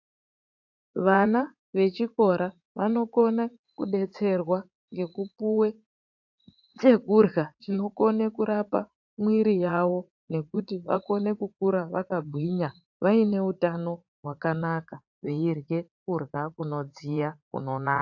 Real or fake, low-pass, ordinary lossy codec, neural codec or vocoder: fake; 7.2 kHz; AAC, 48 kbps; vocoder, 44.1 kHz, 80 mel bands, Vocos